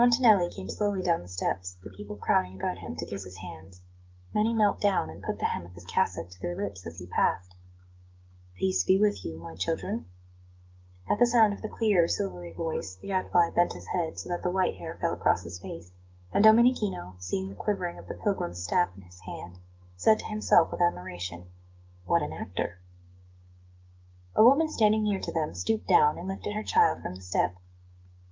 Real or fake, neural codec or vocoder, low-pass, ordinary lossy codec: fake; codec, 16 kHz, 6 kbps, DAC; 7.2 kHz; Opus, 24 kbps